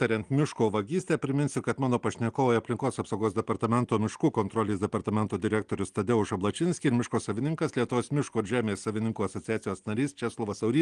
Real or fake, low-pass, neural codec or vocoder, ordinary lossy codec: real; 9.9 kHz; none; Opus, 24 kbps